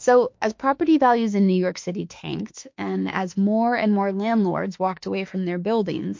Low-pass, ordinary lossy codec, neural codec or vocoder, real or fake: 7.2 kHz; MP3, 48 kbps; autoencoder, 48 kHz, 32 numbers a frame, DAC-VAE, trained on Japanese speech; fake